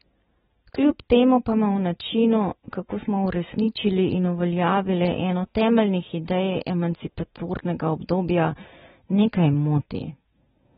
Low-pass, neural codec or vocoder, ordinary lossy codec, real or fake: 19.8 kHz; none; AAC, 16 kbps; real